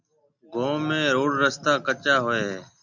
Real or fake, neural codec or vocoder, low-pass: real; none; 7.2 kHz